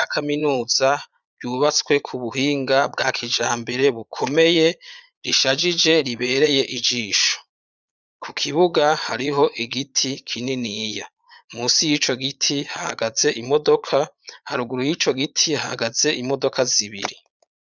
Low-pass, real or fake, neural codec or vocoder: 7.2 kHz; fake; vocoder, 22.05 kHz, 80 mel bands, Vocos